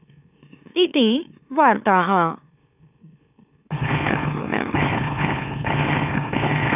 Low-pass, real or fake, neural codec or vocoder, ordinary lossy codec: 3.6 kHz; fake; autoencoder, 44.1 kHz, a latent of 192 numbers a frame, MeloTTS; none